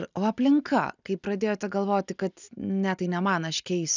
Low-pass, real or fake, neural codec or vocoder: 7.2 kHz; real; none